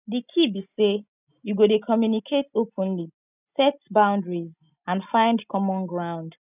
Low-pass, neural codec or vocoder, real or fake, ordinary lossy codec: 3.6 kHz; none; real; none